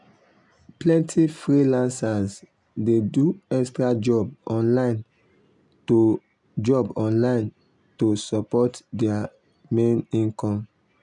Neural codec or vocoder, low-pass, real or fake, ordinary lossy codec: none; 10.8 kHz; real; none